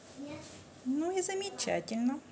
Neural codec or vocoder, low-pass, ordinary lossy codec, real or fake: none; none; none; real